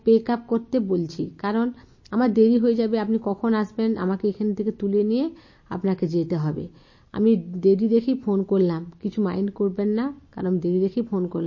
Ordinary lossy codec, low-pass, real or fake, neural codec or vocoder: MP3, 32 kbps; 7.2 kHz; real; none